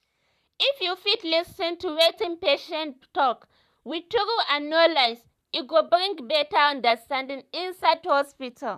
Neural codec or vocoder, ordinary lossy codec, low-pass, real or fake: vocoder, 44.1 kHz, 128 mel bands, Pupu-Vocoder; none; 14.4 kHz; fake